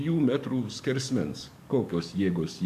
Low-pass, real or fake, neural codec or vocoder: 14.4 kHz; fake; codec, 44.1 kHz, 7.8 kbps, DAC